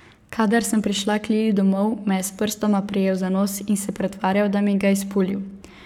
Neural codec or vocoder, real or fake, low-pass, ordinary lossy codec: codec, 44.1 kHz, 7.8 kbps, Pupu-Codec; fake; 19.8 kHz; none